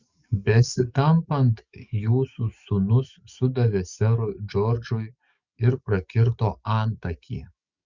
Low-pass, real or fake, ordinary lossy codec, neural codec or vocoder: 7.2 kHz; fake; Opus, 64 kbps; codec, 44.1 kHz, 7.8 kbps, DAC